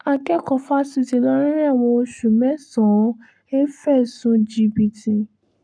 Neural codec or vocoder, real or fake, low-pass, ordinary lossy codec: codec, 44.1 kHz, 7.8 kbps, Pupu-Codec; fake; 9.9 kHz; none